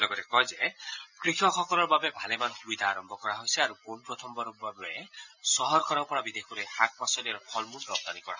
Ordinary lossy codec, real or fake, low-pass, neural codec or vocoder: none; real; 7.2 kHz; none